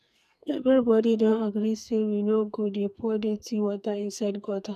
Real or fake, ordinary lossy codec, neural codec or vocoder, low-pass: fake; none; codec, 44.1 kHz, 2.6 kbps, SNAC; 14.4 kHz